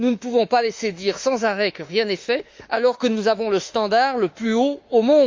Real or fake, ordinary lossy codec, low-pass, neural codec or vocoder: fake; Opus, 32 kbps; 7.2 kHz; autoencoder, 48 kHz, 32 numbers a frame, DAC-VAE, trained on Japanese speech